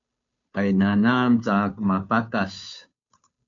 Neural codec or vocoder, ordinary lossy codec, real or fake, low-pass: codec, 16 kHz, 2 kbps, FunCodec, trained on Chinese and English, 25 frames a second; MP3, 64 kbps; fake; 7.2 kHz